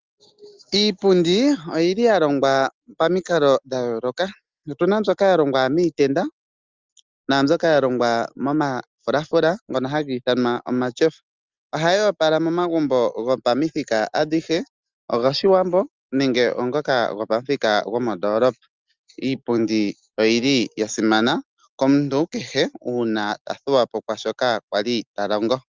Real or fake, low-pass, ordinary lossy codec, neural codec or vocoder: real; 7.2 kHz; Opus, 32 kbps; none